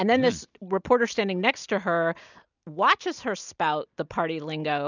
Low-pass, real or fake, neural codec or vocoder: 7.2 kHz; real; none